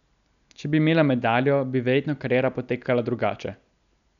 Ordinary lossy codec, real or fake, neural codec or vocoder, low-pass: none; real; none; 7.2 kHz